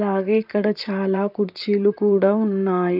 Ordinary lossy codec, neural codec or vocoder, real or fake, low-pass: none; vocoder, 44.1 kHz, 128 mel bands, Pupu-Vocoder; fake; 5.4 kHz